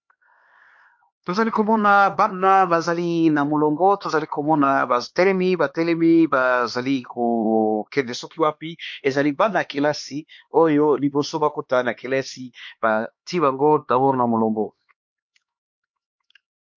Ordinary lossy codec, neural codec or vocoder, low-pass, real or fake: MP3, 48 kbps; codec, 16 kHz, 2 kbps, X-Codec, HuBERT features, trained on LibriSpeech; 7.2 kHz; fake